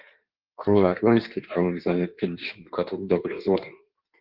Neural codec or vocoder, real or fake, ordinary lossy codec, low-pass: codec, 16 kHz in and 24 kHz out, 1.1 kbps, FireRedTTS-2 codec; fake; Opus, 16 kbps; 5.4 kHz